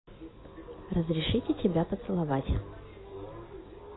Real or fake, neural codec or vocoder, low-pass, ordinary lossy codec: real; none; 7.2 kHz; AAC, 16 kbps